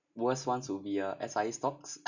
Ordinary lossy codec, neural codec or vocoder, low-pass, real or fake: none; none; 7.2 kHz; real